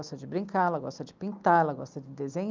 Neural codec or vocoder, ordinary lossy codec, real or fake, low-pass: none; Opus, 24 kbps; real; 7.2 kHz